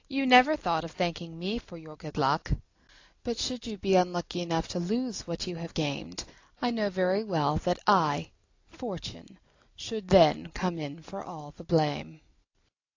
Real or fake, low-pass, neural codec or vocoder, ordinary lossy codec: real; 7.2 kHz; none; AAC, 48 kbps